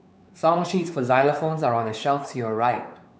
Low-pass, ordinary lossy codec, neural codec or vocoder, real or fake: none; none; codec, 16 kHz, 4 kbps, X-Codec, WavLM features, trained on Multilingual LibriSpeech; fake